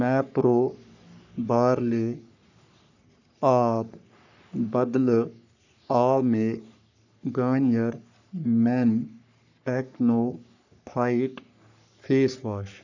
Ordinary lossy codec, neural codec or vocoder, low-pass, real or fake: none; codec, 44.1 kHz, 3.4 kbps, Pupu-Codec; 7.2 kHz; fake